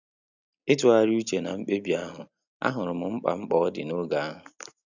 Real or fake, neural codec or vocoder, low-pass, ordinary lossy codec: real; none; 7.2 kHz; none